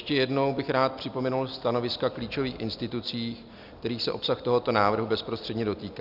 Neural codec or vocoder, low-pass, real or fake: none; 5.4 kHz; real